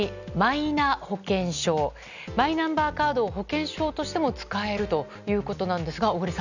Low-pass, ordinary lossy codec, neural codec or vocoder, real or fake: 7.2 kHz; none; none; real